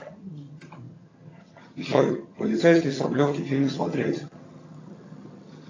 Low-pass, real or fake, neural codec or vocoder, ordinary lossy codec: 7.2 kHz; fake; vocoder, 22.05 kHz, 80 mel bands, HiFi-GAN; AAC, 32 kbps